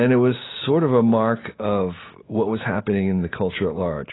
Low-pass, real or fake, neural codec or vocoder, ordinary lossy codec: 7.2 kHz; real; none; AAC, 16 kbps